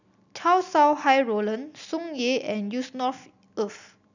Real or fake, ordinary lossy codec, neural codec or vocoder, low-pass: real; none; none; 7.2 kHz